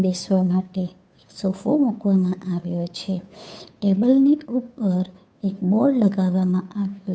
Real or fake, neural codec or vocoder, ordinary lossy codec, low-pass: fake; codec, 16 kHz, 2 kbps, FunCodec, trained on Chinese and English, 25 frames a second; none; none